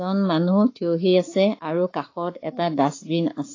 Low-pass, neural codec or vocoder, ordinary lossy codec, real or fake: 7.2 kHz; codec, 44.1 kHz, 7.8 kbps, Pupu-Codec; AAC, 32 kbps; fake